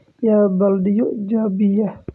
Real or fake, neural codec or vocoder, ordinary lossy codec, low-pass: real; none; none; none